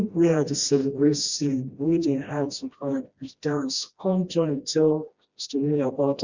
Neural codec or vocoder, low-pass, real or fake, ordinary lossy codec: codec, 16 kHz, 1 kbps, FreqCodec, smaller model; 7.2 kHz; fake; Opus, 64 kbps